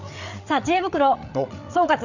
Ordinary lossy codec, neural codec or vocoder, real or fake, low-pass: none; codec, 16 kHz, 8 kbps, FreqCodec, larger model; fake; 7.2 kHz